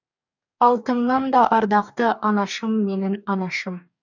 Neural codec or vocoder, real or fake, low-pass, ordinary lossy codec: codec, 44.1 kHz, 2.6 kbps, DAC; fake; 7.2 kHz; none